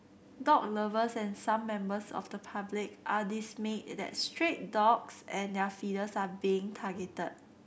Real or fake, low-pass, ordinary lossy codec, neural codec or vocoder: real; none; none; none